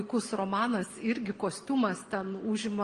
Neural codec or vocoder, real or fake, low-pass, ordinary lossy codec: none; real; 9.9 kHz; Opus, 24 kbps